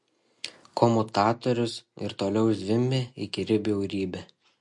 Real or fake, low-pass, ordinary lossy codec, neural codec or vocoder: real; 10.8 kHz; MP3, 48 kbps; none